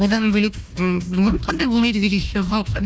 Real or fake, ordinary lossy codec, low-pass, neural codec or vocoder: fake; none; none; codec, 16 kHz, 1 kbps, FunCodec, trained on Chinese and English, 50 frames a second